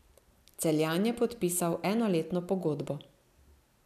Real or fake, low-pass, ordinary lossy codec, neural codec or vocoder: real; 14.4 kHz; none; none